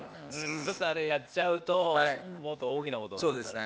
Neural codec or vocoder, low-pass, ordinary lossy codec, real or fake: codec, 16 kHz, 0.8 kbps, ZipCodec; none; none; fake